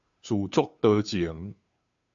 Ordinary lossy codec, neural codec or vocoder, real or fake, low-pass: AAC, 64 kbps; codec, 16 kHz, 2 kbps, FunCodec, trained on Chinese and English, 25 frames a second; fake; 7.2 kHz